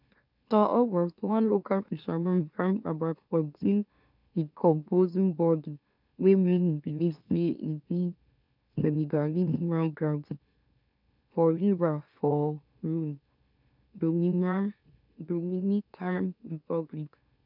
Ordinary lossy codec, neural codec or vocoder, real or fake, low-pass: none; autoencoder, 44.1 kHz, a latent of 192 numbers a frame, MeloTTS; fake; 5.4 kHz